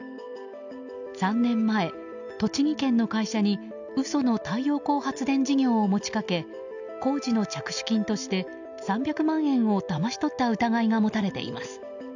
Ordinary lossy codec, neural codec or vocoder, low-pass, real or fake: none; none; 7.2 kHz; real